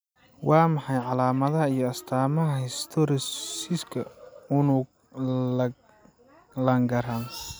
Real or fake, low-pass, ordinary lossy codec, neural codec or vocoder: real; none; none; none